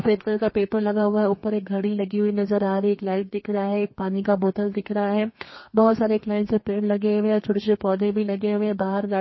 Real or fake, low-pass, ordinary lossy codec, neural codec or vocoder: fake; 7.2 kHz; MP3, 24 kbps; codec, 32 kHz, 1.9 kbps, SNAC